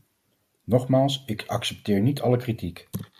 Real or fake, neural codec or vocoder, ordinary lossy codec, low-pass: real; none; AAC, 96 kbps; 14.4 kHz